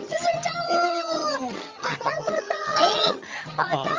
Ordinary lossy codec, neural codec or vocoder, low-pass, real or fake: Opus, 32 kbps; vocoder, 22.05 kHz, 80 mel bands, HiFi-GAN; 7.2 kHz; fake